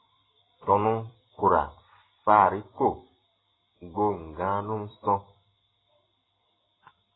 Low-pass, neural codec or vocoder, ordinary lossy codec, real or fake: 7.2 kHz; vocoder, 44.1 kHz, 128 mel bands every 256 samples, BigVGAN v2; AAC, 16 kbps; fake